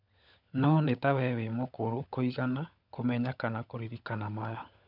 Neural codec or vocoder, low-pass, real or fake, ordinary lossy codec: codec, 16 kHz, 16 kbps, FunCodec, trained on LibriTTS, 50 frames a second; 5.4 kHz; fake; none